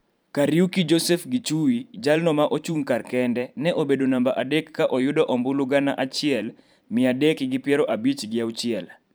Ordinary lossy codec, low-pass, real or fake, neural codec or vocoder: none; none; fake; vocoder, 44.1 kHz, 128 mel bands every 512 samples, BigVGAN v2